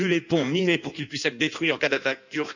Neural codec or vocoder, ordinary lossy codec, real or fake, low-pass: codec, 16 kHz in and 24 kHz out, 1.1 kbps, FireRedTTS-2 codec; none; fake; 7.2 kHz